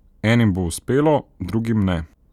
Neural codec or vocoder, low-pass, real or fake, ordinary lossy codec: none; 19.8 kHz; real; none